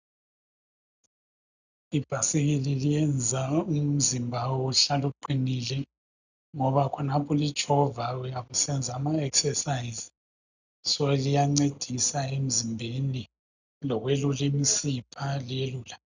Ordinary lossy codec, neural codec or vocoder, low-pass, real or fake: Opus, 64 kbps; none; 7.2 kHz; real